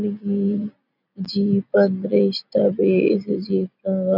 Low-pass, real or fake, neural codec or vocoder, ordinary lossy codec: 5.4 kHz; real; none; none